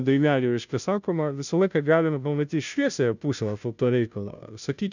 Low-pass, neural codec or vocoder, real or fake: 7.2 kHz; codec, 16 kHz, 0.5 kbps, FunCodec, trained on Chinese and English, 25 frames a second; fake